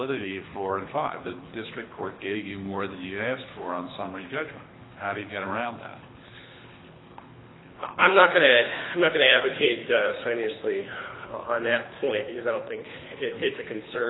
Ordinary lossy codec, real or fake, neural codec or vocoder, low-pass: AAC, 16 kbps; fake; codec, 24 kHz, 3 kbps, HILCodec; 7.2 kHz